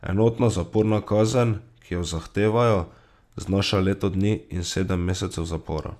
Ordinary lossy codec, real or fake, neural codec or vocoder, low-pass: none; real; none; 14.4 kHz